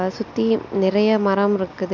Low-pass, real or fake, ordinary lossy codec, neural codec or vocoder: 7.2 kHz; real; none; none